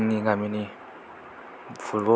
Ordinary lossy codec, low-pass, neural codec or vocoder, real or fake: none; none; none; real